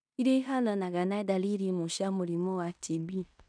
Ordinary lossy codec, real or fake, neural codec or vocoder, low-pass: none; fake; codec, 16 kHz in and 24 kHz out, 0.9 kbps, LongCat-Audio-Codec, fine tuned four codebook decoder; 9.9 kHz